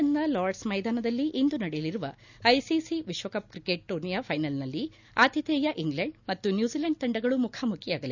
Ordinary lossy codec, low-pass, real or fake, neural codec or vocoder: none; 7.2 kHz; real; none